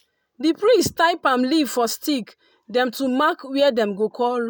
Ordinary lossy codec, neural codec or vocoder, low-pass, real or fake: none; none; none; real